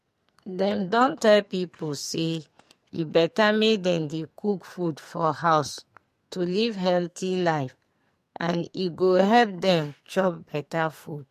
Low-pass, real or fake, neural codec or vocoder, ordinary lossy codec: 14.4 kHz; fake; codec, 44.1 kHz, 2.6 kbps, SNAC; MP3, 64 kbps